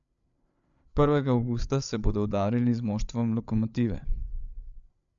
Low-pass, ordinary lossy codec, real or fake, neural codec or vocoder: 7.2 kHz; none; fake; codec, 16 kHz, 8 kbps, FreqCodec, larger model